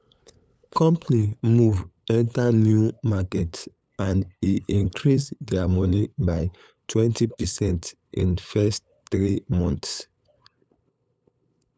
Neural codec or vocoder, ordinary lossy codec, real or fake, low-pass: codec, 16 kHz, 8 kbps, FunCodec, trained on LibriTTS, 25 frames a second; none; fake; none